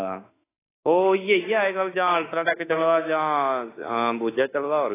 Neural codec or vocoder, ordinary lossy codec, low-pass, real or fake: autoencoder, 48 kHz, 32 numbers a frame, DAC-VAE, trained on Japanese speech; AAC, 16 kbps; 3.6 kHz; fake